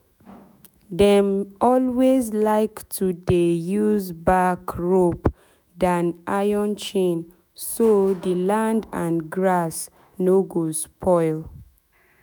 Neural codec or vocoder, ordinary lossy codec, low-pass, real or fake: autoencoder, 48 kHz, 128 numbers a frame, DAC-VAE, trained on Japanese speech; none; none; fake